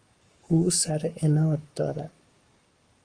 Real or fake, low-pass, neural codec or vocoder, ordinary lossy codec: fake; 9.9 kHz; codec, 44.1 kHz, 7.8 kbps, Pupu-Codec; Opus, 32 kbps